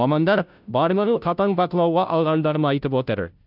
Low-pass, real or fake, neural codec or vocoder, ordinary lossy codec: 5.4 kHz; fake; codec, 16 kHz, 0.5 kbps, FunCodec, trained on Chinese and English, 25 frames a second; none